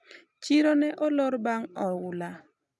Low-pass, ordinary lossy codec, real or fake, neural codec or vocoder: none; none; real; none